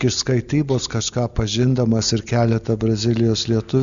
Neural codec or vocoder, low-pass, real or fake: none; 7.2 kHz; real